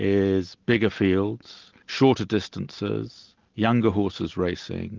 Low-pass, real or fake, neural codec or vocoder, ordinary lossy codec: 7.2 kHz; real; none; Opus, 32 kbps